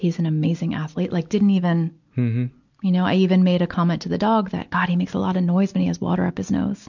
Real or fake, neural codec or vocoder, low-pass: real; none; 7.2 kHz